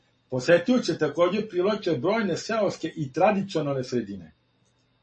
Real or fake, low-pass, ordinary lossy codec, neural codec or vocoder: real; 9.9 kHz; MP3, 32 kbps; none